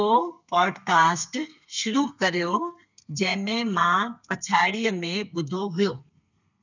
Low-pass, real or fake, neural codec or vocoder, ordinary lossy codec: 7.2 kHz; fake; codec, 32 kHz, 1.9 kbps, SNAC; none